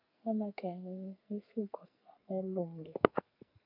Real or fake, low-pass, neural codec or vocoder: fake; 5.4 kHz; codec, 44.1 kHz, 2.6 kbps, SNAC